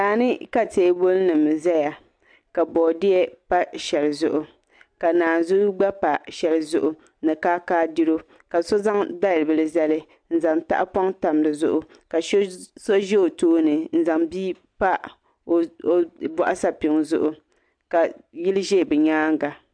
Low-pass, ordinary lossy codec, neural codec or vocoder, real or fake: 9.9 kHz; MP3, 64 kbps; none; real